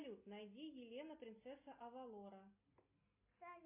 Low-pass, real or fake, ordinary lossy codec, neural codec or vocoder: 3.6 kHz; real; MP3, 24 kbps; none